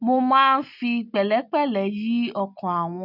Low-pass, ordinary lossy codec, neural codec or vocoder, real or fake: 5.4 kHz; none; none; real